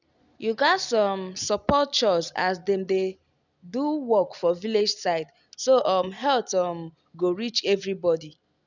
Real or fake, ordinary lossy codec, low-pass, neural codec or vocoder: real; none; 7.2 kHz; none